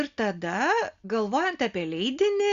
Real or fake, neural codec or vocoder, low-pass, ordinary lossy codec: real; none; 7.2 kHz; Opus, 64 kbps